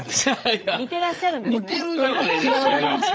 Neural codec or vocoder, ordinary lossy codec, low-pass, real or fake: codec, 16 kHz, 8 kbps, FreqCodec, larger model; none; none; fake